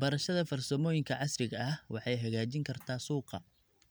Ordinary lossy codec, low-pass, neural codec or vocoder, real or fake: none; none; none; real